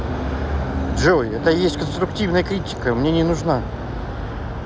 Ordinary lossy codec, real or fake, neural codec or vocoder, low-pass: none; real; none; none